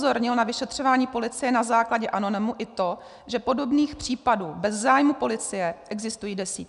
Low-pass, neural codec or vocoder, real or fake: 10.8 kHz; none; real